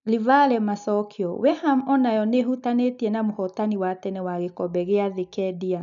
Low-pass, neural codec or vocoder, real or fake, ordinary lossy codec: 7.2 kHz; none; real; none